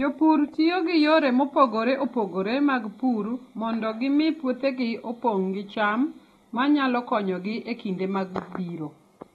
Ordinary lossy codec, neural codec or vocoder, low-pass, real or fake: AAC, 32 kbps; none; 19.8 kHz; real